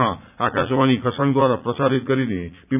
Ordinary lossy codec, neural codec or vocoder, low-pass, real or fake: none; vocoder, 44.1 kHz, 80 mel bands, Vocos; 3.6 kHz; fake